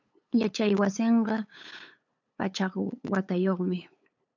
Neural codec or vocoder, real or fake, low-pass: codec, 16 kHz, 8 kbps, FunCodec, trained on LibriTTS, 25 frames a second; fake; 7.2 kHz